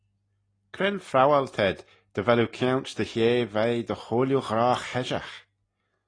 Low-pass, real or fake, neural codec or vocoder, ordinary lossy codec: 9.9 kHz; real; none; AAC, 32 kbps